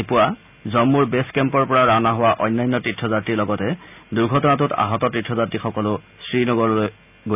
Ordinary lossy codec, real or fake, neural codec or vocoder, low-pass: none; real; none; 3.6 kHz